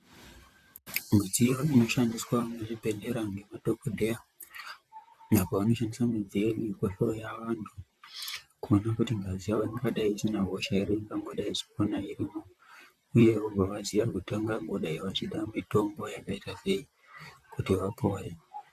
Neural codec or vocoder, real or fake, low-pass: vocoder, 44.1 kHz, 128 mel bands, Pupu-Vocoder; fake; 14.4 kHz